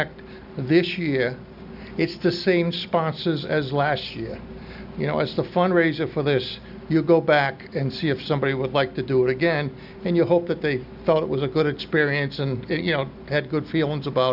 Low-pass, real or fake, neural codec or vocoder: 5.4 kHz; real; none